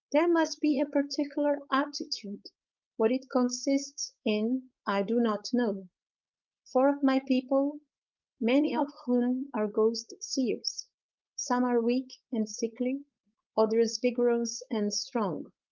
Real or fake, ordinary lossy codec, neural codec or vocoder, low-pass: fake; Opus, 24 kbps; codec, 16 kHz, 4.8 kbps, FACodec; 7.2 kHz